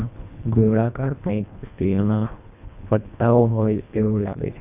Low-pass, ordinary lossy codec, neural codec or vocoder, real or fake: 3.6 kHz; none; codec, 24 kHz, 1.5 kbps, HILCodec; fake